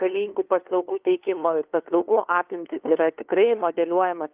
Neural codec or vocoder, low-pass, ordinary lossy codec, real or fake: codec, 16 kHz, 2 kbps, FunCodec, trained on LibriTTS, 25 frames a second; 3.6 kHz; Opus, 32 kbps; fake